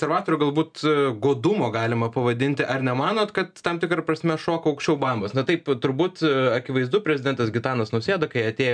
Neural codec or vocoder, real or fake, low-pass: none; real; 9.9 kHz